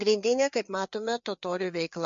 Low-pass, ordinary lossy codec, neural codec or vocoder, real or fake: 7.2 kHz; MP3, 48 kbps; codec, 16 kHz, 4 kbps, FreqCodec, larger model; fake